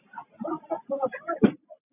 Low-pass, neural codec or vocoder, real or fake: 3.6 kHz; none; real